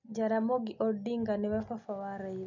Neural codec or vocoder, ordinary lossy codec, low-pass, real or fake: none; none; 7.2 kHz; real